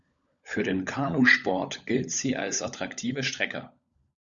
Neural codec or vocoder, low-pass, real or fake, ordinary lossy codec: codec, 16 kHz, 16 kbps, FunCodec, trained on LibriTTS, 50 frames a second; 7.2 kHz; fake; Opus, 64 kbps